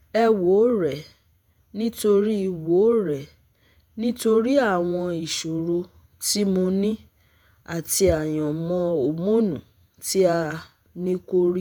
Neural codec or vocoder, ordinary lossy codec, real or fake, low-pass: vocoder, 48 kHz, 128 mel bands, Vocos; none; fake; 19.8 kHz